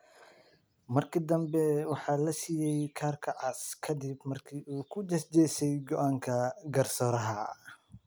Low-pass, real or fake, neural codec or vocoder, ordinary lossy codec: none; real; none; none